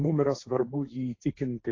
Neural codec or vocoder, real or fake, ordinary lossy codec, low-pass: codec, 16 kHz, 1.1 kbps, Voila-Tokenizer; fake; AAC, 32 kbps; 7.2 kHz